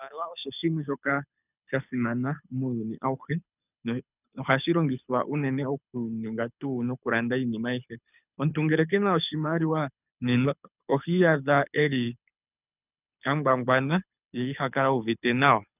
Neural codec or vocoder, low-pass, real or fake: codec, 24 kHz, 6 kbps, HILCodec; 3.6 kHz; fake